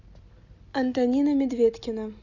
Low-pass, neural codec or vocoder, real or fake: 7.2 kHz; none; real